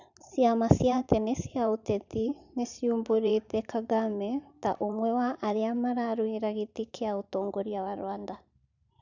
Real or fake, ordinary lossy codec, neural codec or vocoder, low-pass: fake; none; vocoder, 44.1 kHz, 128 mel bands every 512 samples, BigVGAN v2; 7.2 kHz